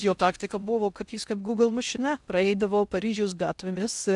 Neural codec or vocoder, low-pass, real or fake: codec, 16 kHz in and 24 kHz out, 0.6 kbps, FocalCodec, streaming, 2048 codes; 10.8 kHz; fake